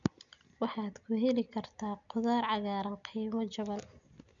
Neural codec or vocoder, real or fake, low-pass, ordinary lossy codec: none; real; 7.2 kHz; none